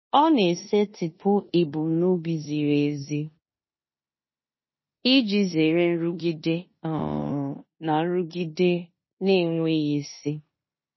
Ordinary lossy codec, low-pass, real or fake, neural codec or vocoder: MP3, 24 kbps; 7.2 kHz; fake; codec, 16 kHz in and 24 kHz out, 0.9 kbps, LongCat-Audio-Codec, four codebook decoder